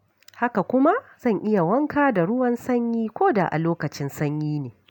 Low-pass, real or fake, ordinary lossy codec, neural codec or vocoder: 19.8 kHz; real; none; none